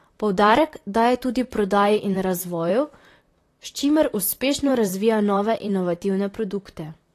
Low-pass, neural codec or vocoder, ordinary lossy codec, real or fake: 14.4 kHz; vocoder, 44.1 kHz, 128 mel bands, Pupu-Vocoder; AAC, 48 kbps; fake